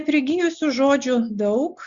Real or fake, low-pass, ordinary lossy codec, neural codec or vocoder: real; 7.2 kHz; Opus, 64 kbps; none